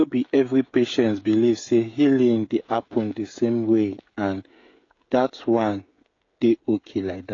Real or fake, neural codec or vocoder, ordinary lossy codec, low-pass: fake; codec, 16 kHz, 16 kbps, FreqCodec, smaller model; AAC, 32 kbps; 7.2 kHz